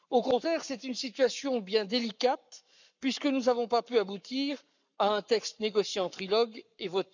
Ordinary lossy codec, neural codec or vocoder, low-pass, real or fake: none; codec, 44.1 kHz, 7.8 kbps, Pupu-Codec; 7.2 kHz; fake